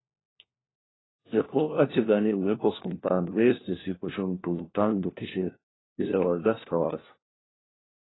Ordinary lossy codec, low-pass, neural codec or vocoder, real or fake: AAC, 16 kbps; 7.2 kHz; codec, 16 kHz, 1 kbps, FunCodec, trained on LibriTTS, 50 frames a second; fake